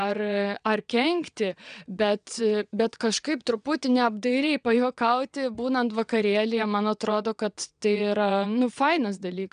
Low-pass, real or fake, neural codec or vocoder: 9.9 kHz; fake; vocoder, 22.05 kHz, 80 mel bands, WaveNeXt